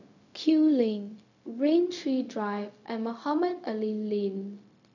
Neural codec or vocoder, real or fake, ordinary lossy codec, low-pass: codec, 16 kHz, 0.4 kbps, LongCat-Audio-Codec; fake; none; 7.2 kHz